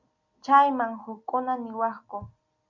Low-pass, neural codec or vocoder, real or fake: 7.2 kHz; none; real